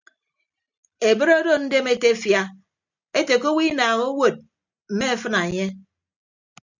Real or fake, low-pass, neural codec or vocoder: real; 7.2 kHz; none